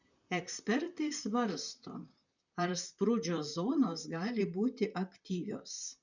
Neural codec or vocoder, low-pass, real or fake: vocoder, 44.1 kHz, 128 mel bands, Pupu-Vocoder; 7.2 kHz; fake